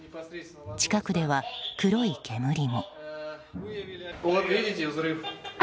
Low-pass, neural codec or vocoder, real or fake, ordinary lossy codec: none; none; real; none